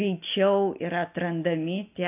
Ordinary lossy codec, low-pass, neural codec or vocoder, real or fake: AAC, 24 kbps; 3.6 kHz; none; real